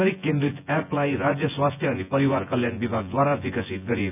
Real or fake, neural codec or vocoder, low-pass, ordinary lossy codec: fake; vocoder, 24 kHz, 100 mel bands, Vocos; 3.6 kHz; none